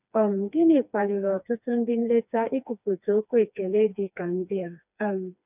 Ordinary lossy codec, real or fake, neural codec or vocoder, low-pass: none; fake; codec, 16 kHz, 2 kbps, FreqCodec, smaller model; 3.6 kHz